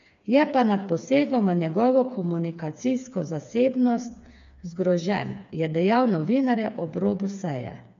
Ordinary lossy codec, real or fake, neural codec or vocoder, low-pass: MP3, 64 kbps; fake; codec, 16 kHz, 4 kbps, FreqCodec, smaller model; 7.2 kHz